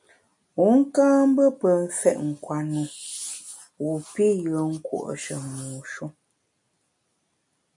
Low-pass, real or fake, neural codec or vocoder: 10.8 kHz; real; none